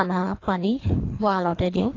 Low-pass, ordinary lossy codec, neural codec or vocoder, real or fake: 7.2 kHz; AAC, 32 kbps; codec, 24 kHz, 3 kbps, HILCodec; fake